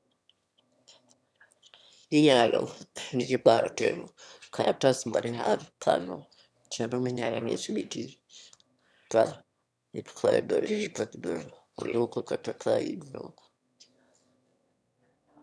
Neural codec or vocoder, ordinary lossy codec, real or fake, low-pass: autoencoder, 22.05 kHz, a latent of 192 numbers a frame, VITS, trained on one speaker; none; fake; none